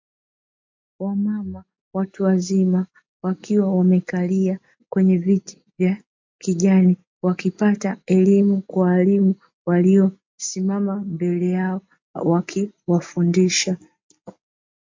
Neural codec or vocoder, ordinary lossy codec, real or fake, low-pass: none; MP3, 48 kbps; real; 7.2 kHz